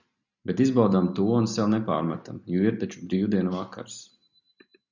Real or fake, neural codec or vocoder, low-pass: real; none; 7.2 kHz